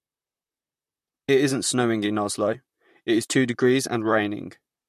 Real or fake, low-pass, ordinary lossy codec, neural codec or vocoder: fake; 14.4 kHz; MP3, 64 kbps; vocoder, 44.1 kHz, 128 mel bands, Pupu-Vocoder